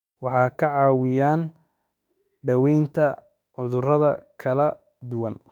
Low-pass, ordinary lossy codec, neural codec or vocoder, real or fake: 19.8 kHz; none; autoencoder, 48 kHz, 32 numbers a frame, DAC-VAE, trained on Japanese speech; fake